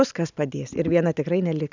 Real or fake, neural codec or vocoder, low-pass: real; none; 7.2 kHz